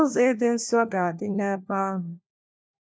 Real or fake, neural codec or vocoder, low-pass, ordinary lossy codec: fake; codec, 16 kHz, 1 kbps, FunCodec, trained on LibriTTS, 50 frames a second; none; none